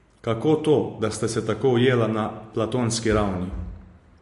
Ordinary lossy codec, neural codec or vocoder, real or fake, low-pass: MP3, 48 kbps; none; real; 14.4 kHz